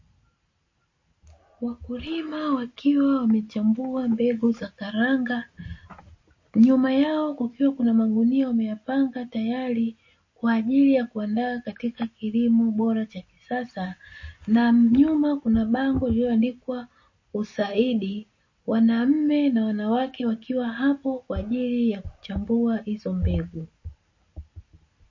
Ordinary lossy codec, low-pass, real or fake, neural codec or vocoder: MP3, 32 kbps; 7.2 kHz; real; none